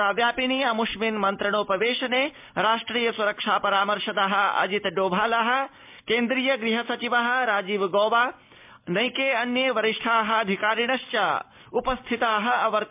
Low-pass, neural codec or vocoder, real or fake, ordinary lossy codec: 3.6 kHz; none; real; MP3, 32 kbps